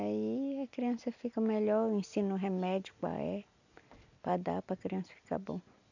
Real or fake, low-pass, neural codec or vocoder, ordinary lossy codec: real; 7.2 kHz; none; none